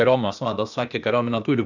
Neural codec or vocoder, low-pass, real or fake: codec, 16 kHz, 0.8 kbps, ZipCodec; 7.2 kHz; fake